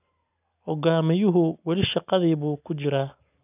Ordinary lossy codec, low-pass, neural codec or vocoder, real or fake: none; 3.6 kHz; none; real